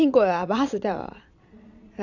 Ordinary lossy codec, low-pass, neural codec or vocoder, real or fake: Opus, 64 kbps; 7.2 kHz; vocoder, 22.05 kHz, 80 mel bands, Vocos; fake